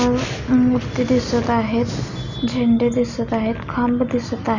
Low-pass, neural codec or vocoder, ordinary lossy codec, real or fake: 7.2 kHz; none; none; real